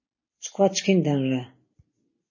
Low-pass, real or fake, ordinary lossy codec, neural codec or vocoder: 7.2 kHz; real; MP3, 32 kbps; none